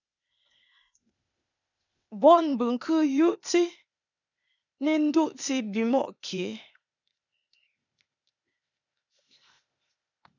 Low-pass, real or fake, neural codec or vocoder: 7.2 kHz; fake; codec, 16 kHz, 0.8 kbps, ZipCodec